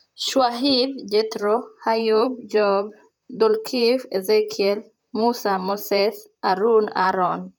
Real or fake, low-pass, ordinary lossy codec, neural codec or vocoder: fake; none; none; vocoder, 44.1 kHz, 128 mel bands, Pupu-Vocoder